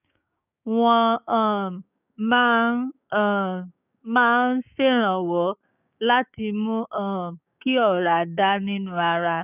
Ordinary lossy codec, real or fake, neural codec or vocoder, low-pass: none; fake; codec, 16 kHz, 6 kbps, DAC; 3.6 kHz